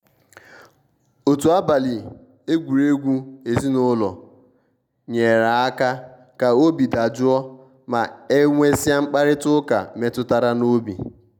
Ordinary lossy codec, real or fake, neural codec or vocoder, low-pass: none; real; none; 19.8 kHz